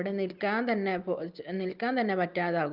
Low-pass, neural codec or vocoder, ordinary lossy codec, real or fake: 5.4 kHz; none; Opus, 32 kbps; real